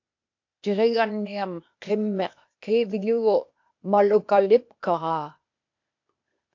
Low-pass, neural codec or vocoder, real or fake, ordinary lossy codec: 7.2 kHz; codec, 16 kHz, 0.8 kbps, ZipCodec; fake; AAC, 48 kbps